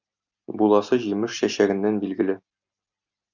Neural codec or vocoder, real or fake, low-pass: none; real; 7.2 kHz